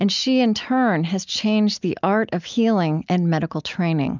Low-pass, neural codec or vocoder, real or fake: 7.2 kHz; none; real